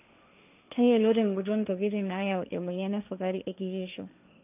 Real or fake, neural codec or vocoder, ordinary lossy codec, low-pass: fake; codec, 16 kHz, 1.1 kbps, Voila-Tokenizer; none; 3.6 kHz